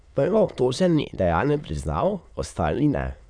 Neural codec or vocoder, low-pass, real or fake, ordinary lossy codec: autoencoder, 22.05 kHz, a latent of 192 numbers a frame, VITS, trained on many speakers; 9.9 kHz; fake; none